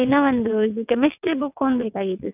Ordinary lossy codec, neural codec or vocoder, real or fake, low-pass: none; vocoder, 22.05 kHz, 80 mel bands, WaveNeXt; fake; 3.6 kHz